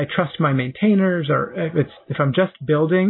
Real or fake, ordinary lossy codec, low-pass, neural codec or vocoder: real; MP3, 24 kbps; 5.4 kHz; none